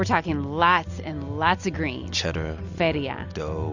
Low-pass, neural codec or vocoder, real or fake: 7.2 kHz; none; real